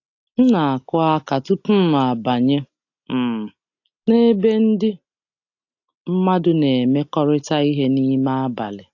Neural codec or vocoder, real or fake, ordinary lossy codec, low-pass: none; real; AAC, 48 kbps; 7.2 kHz